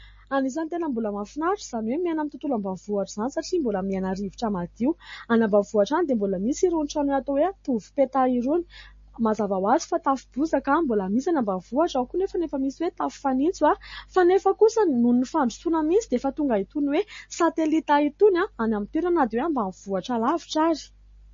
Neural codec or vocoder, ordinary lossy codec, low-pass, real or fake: none; MP3, 32 kbps; 7.2 kHz; real